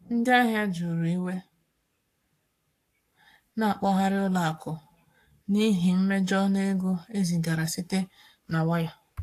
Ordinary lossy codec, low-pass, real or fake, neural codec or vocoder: AAC, 64 kbps; 14.4 kHz; fake; codec, 44.1 kHz, 7.8 kbps, Pupu-Codec